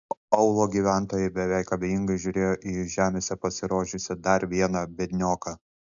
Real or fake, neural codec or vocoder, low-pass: real; none; 7.2 kHz